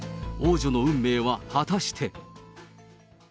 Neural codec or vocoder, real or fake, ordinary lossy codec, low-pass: none; real; none; none